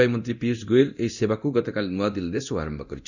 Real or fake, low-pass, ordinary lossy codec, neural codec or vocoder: fake; 7.2 kHz; none; codec, 24 kHz, 0.9 kbps, DualCodec